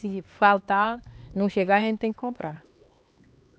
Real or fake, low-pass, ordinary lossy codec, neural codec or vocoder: fake; none; none; codec, 16 kHz, 2 kbps, X-Codec, HuBERT features, trained on LibriSpeech